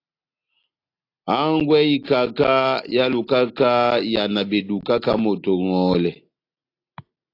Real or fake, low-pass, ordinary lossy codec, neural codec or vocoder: real; 5.4 kHz; AAC, 32 kbps; none